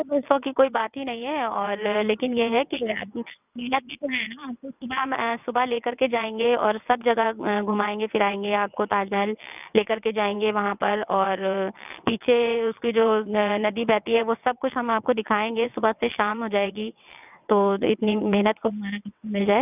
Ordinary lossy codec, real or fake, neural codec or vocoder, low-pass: none; fake; vocoder, 22.05 kHz, 80 mel bands, WaveNeXt; 3.6 kHz